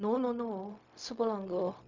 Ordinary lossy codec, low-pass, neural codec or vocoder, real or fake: MP3, 64 kbps; 7.2 kHz; codec, 16 kHz, 0.4 kbps, LongCat-Audio-Codec; fake